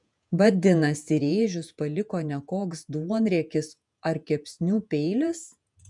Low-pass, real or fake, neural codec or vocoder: 10.8 kHz; fake; vocoder, 48 kHz, 128 mel bands, Vocos